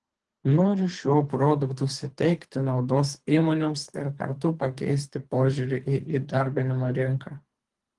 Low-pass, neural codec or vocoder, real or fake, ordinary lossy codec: 10.8 kHz; codec, 24 kHz, 3 kbps, HILCodec; fake; Opus, 24 kbps